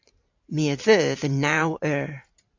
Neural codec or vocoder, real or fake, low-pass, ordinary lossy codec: vocoder, 44.1 kHz, 128 mel bands every 512 samples, BigVGAN v2; fake; 7.2 kHz; AAC, 48 kbps